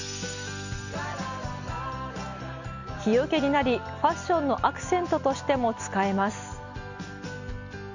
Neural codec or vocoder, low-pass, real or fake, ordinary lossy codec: none; 7.2 kHz; real; none